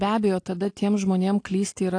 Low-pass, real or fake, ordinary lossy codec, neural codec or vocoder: 9.9 kHz; real; AAC, 48 kbps; none